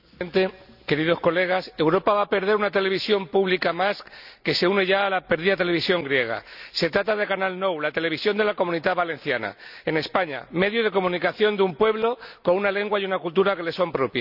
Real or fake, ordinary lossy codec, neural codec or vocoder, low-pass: real; none; none; 5.4 kHz